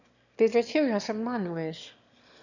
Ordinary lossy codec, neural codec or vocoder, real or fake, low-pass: none; autoencoder, 22.05 kHz, a latent of 192 numbers a frame, VITS, trained on one speaker; fake; 7.2 kHz